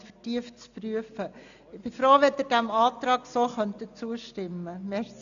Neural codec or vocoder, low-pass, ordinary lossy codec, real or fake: none; 7.2 kHz; none; real